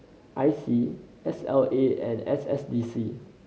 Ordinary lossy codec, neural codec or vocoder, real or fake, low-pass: none; none; real; none